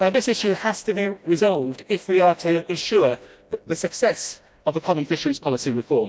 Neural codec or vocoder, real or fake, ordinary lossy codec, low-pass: codec, 16 kHz, 1 kbps, FreqCodec, smaller model; fake; none; none